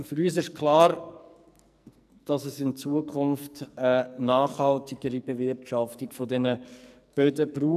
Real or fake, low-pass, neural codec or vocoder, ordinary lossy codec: fake; 14.4 kHz; codec, 44.1 kHz, 2.6 kbps, SNAC; none